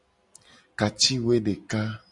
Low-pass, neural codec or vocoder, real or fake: 10.8 kHz; none; real